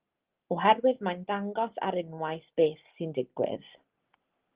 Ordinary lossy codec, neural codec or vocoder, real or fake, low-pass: Opus, 16 kbps; none; real; 3.6 kHz